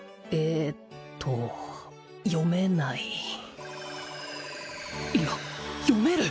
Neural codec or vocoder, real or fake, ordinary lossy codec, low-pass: none; real; none; none